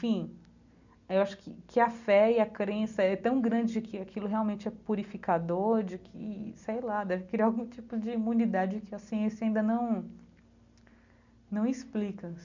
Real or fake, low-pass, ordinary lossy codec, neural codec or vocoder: real; 7.2 kHz; none; none